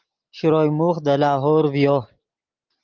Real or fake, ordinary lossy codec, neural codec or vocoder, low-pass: real; Opus, 32 kbps; none; 7.2 kHz